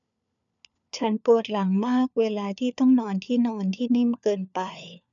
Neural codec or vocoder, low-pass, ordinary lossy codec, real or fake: codec, 16 kHz, 4 kbps, FunCodec, trained on LibriTTS, 50 frames a second; 7.2 kHz; MP3, 96 kbps; fake